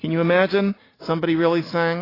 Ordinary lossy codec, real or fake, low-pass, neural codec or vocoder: AAC, 24 kbps; real; 5.4 kHz; none